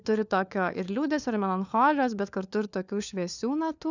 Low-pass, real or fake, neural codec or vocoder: 7.2 kHz; fake; codec, 16 kHz, 4 kbps, FunCodec, trained on LibriTTS, 50 frames a second